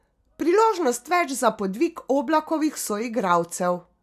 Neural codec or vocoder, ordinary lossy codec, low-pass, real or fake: none; AAC, 96 kbps; 14.4 kHz; real